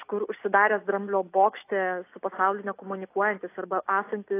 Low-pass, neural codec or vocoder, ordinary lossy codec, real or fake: 3.6 kHz; none; AAC, 24 kbps; real